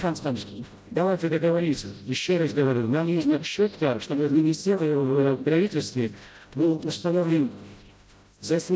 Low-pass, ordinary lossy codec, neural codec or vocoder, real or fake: none; none; codec, 16 kHz, 0.5 kbps, FreqCodec, smaller model; fake